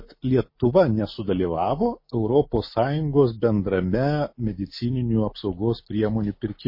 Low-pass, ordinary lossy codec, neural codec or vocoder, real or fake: 5.4 kHz; MP3, 24 kbps; none; real